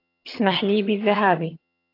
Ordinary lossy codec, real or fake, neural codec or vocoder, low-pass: AAC, 32 kbps; fake; vocoder, 22.05 kHz, 80 mel bands, HiFi-GAN; 5.4 kHz